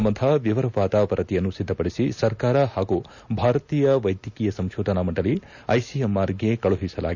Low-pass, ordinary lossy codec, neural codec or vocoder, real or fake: 7.2 kHz; none; none; real